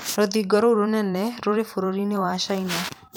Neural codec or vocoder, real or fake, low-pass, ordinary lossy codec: vocoder, 44.1 kHz, 128 mel bands every 512 samples, BigVGAN v2; fake; none; none